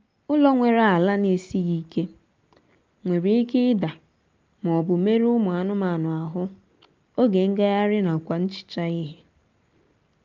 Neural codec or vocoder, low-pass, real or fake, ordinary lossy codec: none; 7.2 kHz; real; Opus, 24 kbps